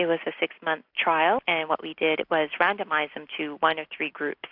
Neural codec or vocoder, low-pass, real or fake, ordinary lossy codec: none; 5.4 kHz; real; AAC, 48 kbps